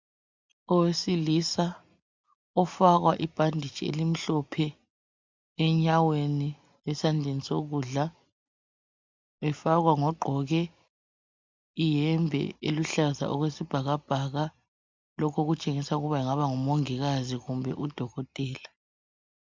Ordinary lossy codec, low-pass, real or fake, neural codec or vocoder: MP3, 64 kbps; 7.2 kHz; real; none